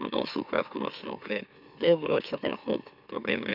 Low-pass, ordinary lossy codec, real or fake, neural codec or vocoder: 5.4 kHz; none; fake; autoencoder, 44.1 kHz, a latent of 192 numbers a frame, MeloTTS